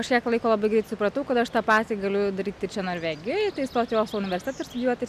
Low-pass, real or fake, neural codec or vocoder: 14.4 kHz; real; none